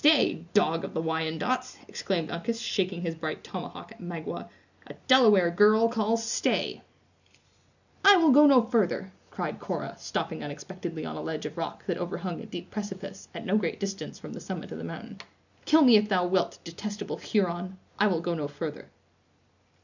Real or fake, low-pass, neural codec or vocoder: real; 7.2 kHz; none